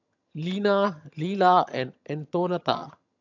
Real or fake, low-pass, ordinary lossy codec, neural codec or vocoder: fake; 7.2 kHz; none; vocoder, 22.05 kHz, 80 mel bands, HiFi-GAN